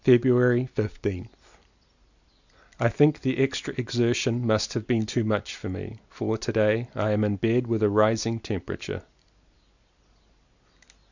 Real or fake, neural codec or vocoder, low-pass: real; none; 7.2 kHz